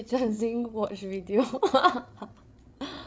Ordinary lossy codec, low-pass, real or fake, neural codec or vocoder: none; none; fake; codec, 16 kHz, 8 kbps, FreqCodec, larger model